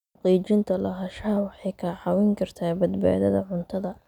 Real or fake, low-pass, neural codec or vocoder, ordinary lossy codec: real; 19.8 kHz; none; none